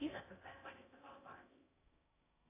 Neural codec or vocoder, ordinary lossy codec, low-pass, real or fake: codec, 16 kHz in and 24 kHz out, 0.6 kbps, FocalCodec, streaming, 4096 codes; AAC, 32 kbps; 3.6 kHz; fake